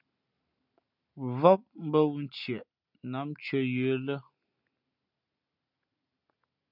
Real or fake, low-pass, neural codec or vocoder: real; 5.4 kHz; none